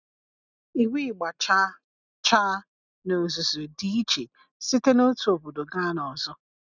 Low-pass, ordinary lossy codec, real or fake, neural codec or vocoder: 7.2 kHz; none; real; none